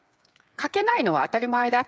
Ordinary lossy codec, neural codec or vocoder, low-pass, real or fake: none; codec, 16 kHz, 8 kbps, FreqCodec, smaller model; none; fake